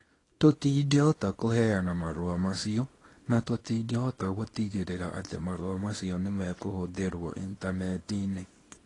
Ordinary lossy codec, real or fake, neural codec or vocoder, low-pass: AAC, 32 kbps; fake; codec, 24 kHz, 0.9 kbps, WavTokenizer, small release; 10.8 kHz